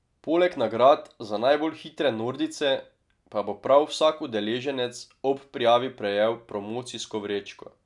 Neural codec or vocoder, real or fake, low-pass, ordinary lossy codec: none; real; 10.8 kHz; none